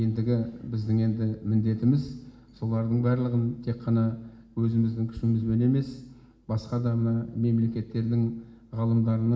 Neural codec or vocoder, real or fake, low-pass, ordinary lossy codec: none; real; none; none